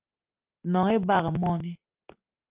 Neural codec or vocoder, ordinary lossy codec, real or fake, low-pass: none; Opus, 32 kbps; real; 3.6 kHz